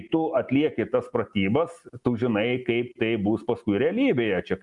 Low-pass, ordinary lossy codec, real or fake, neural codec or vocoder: 10.8 kHz; Opus, 64 kbps; real; none